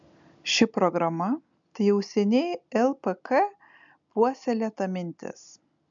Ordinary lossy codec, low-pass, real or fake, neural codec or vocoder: MP3, 64 kbps; 7.2 kHz; real; none